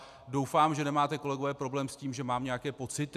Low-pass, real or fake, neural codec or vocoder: 14.4 kHz; real; none